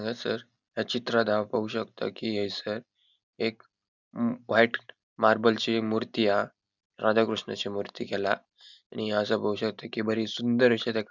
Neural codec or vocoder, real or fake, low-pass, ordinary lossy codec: none; real; 7.2 kHz; none